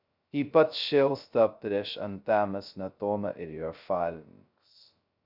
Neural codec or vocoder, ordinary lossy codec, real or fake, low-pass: codec, 16 kHz, 0.2 kbps, FocalCodec; Opus, 64 kbps; fake; 5.4 kHz